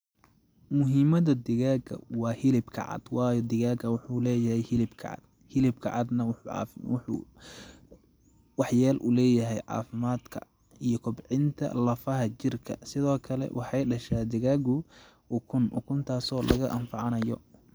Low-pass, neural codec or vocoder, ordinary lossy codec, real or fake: none; none; none; real